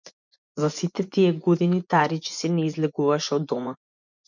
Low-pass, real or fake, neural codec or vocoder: 7.2 kHz; fake; vocoder, 24 kHz, 100 mel bands, Vocos